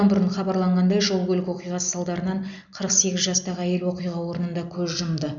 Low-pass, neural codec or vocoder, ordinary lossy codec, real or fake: 7.2 kHz; none; none; real